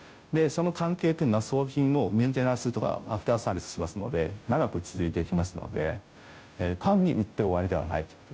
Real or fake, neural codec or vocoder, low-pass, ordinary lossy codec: fake; codec, 16 kHz, 0.5 kbps, FunCodec, trained on Chinese and English, 25 frames a second; none; none